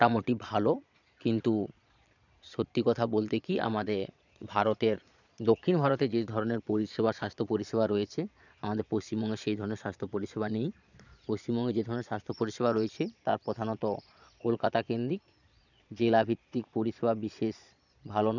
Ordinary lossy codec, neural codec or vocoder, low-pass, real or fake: none; vocoder, 22.05 kHz, 80 mel bands, Vocos; 7.2 kHz; fake